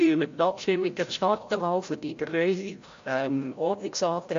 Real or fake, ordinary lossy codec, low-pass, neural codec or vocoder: fake; AAC, 48 kbps; 7.2 kHz; codec, 16 kHz, 0.5 kbps, FreqCodec, larger model